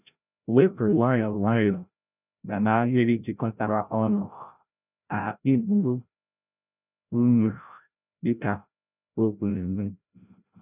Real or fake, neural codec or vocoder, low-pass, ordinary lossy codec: fake; codec, 16 kHz, 0.5 kbps, FreqCodec, larger model; 3.6 kHz; none